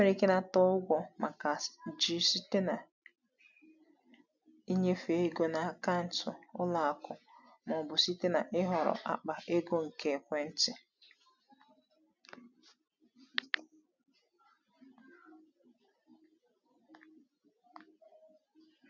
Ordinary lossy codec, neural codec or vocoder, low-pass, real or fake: none; none; 7.2 kHz; real